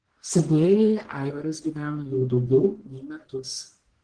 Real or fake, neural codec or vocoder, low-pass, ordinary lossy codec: fake; codec, 44.1 kHz, 1.7 kbps, Pupu-Codec; 9.9 kHz; Opus, 16 kbps